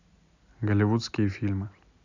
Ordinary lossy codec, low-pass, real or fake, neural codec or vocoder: none; 7.2 kHz; real; none